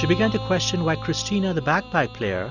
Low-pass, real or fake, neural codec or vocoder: 7.2 kHz; real; none